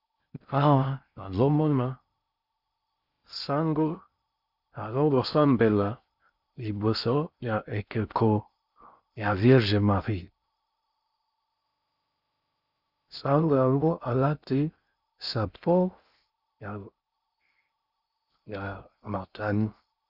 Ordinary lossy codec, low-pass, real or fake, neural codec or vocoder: Opus, 64 kbps; 5.4 kHz; fake; codec, 16 kHz in and 24 kHz out, 0.6 kbps, FocalCodec, streaming, 4096 codes